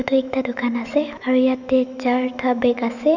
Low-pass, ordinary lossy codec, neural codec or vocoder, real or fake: 7.2 kHz; none; autoencoder, 48 kHz, 128 numbers a frame, DAC-VAE, trained on Japanese speech; fake